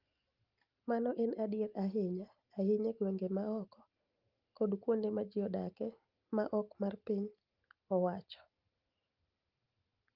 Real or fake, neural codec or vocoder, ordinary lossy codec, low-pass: real; none; Opus, 24 kbps; 5.4 kHz